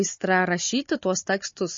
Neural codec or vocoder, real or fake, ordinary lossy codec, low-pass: codec, 16 kHz, 16 kbps, FunCodec, trained on Chinese and English, 50 frames a second; fake; MP3, 32 kbps; 7.2 kHz